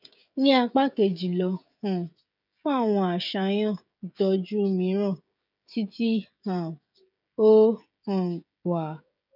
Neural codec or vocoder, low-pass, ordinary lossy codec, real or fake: codec, 16 kHz, 16 kbps, FreqCodec, smaller model; 5.4 kHz; none; fake